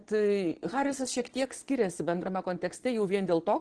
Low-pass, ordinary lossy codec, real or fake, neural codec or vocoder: 9.9 kHz; Opus, 16 kbps; fake; vocoder, 22.05 kHz, 80 mel bands, Vocos